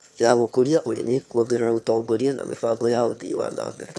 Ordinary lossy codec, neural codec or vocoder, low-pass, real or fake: none; autoencoder, 22.05 kHz, a latent of 192 numbers a frame, VITS, trained on one speaker; none; fake